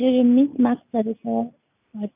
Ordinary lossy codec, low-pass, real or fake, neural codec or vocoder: none; 3.6 kHz; real; none